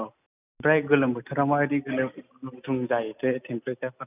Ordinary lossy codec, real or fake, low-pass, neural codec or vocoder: none; real; 3.6 kHz; none